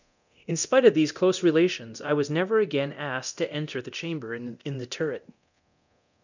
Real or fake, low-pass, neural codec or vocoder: fake; 7.2 kHz; codec, 24 kHz, 0.9 kbps, DualCodec